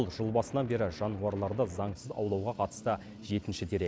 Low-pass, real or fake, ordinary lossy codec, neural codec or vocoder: none; real; none; none